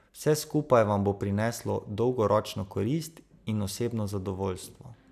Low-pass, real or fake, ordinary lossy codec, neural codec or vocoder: 14.4 kHz; real; none; none